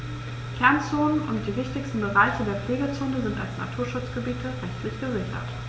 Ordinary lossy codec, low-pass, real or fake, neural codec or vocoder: none; none; real; none